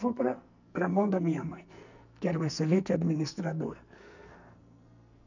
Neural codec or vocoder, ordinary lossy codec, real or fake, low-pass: codec, 44.1 kHz, 2.6 kbps, SNAC; none; fake; 7.2 kHz